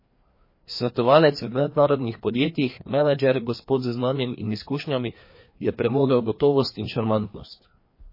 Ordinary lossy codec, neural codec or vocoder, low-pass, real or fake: MP3, 24 kbps; codec, 16 kHz, 2 kbps, FreqCodec, larger model; 5.4 kHz; fake